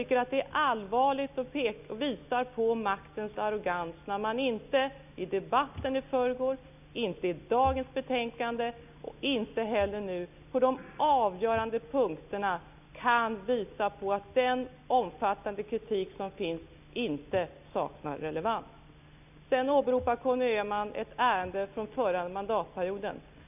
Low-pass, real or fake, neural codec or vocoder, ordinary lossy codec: 3.6 kHz; real; none; none